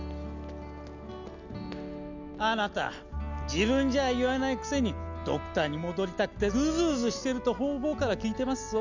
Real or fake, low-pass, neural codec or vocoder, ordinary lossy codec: real; 7.2 kHz; none; none